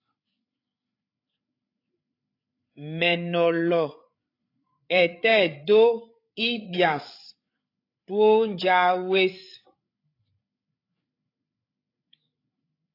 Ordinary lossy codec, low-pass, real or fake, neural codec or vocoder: AAC, 32 kbps; 5.4 kHz; fake; codec, 16 kHz, 8 kbps, FreqCodec, larger model